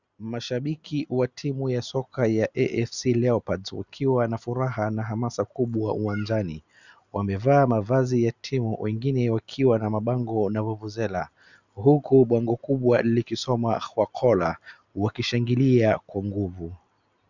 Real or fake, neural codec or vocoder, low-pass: real; none; 7.2 kHz